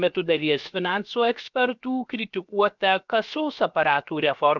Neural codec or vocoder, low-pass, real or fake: codec, 16 kHz, about 1 kbps, DyCAST, with the encoder's durations; 7.2 kHz; fake